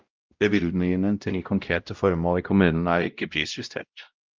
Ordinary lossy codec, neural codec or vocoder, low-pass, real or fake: Opus, 24 kbps; codec, 16 kHz, 0.5 kbps, X-Codec, WavLM features, trained on Multilingual LibriSpeech; 7.2 kHz; fake